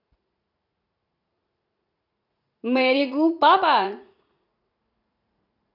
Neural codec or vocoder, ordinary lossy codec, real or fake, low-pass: vocoder, 44.1 kHz, 80 mel bands, Vocos; none; fake; 5.4 kHz